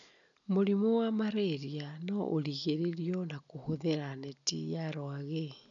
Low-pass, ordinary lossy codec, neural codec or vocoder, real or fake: 7.2 kHz; none; none; real